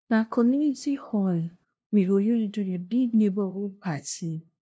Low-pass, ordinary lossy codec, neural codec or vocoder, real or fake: none; none; codec, 16 kHz, 0.5 kbps, FunCodec, trained on LibriTTS, 25 frames a second; fake